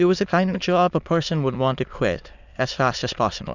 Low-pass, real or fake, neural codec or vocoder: 7.2 kHz; fake; autoencoder, 22.05 kHz, a latent of 192 numbers a frame, VITS, trained on many speakers